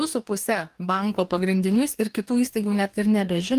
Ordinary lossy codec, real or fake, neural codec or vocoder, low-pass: Opus, 32 kbps; fake; codec, 44.1 kHz, 2.6 kbps, DAC; 14.4 kHz